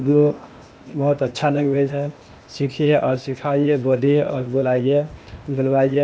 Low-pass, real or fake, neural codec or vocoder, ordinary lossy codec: none; fake; codec, 16 kHz, 0.8 kbps, ZipCodec; none